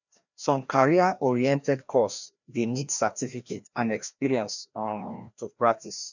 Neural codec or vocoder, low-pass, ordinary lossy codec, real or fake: codec, 16 kHz, 1 kbps, FreqCodec, larger model; 7.2 kHz; none; fake